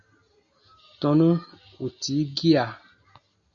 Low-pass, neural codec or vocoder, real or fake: 7.2 kHz; none; real